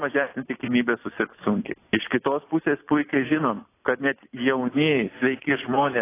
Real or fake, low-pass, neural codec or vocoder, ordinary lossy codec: real; 3.6 kHz; none; AAC, 24 kbps